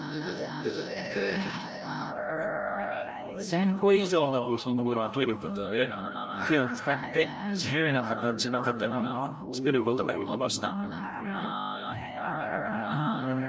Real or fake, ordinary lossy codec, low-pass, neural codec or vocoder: fake; none; none; codec, 16 kHz, 0.5 kbps, FreqCodec, larger model